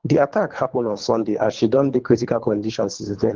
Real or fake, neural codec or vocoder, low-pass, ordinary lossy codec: fake; codec, 24 kHz, 3 kbps, HILCodec; 7.2 kHz; Opus, 16 kbps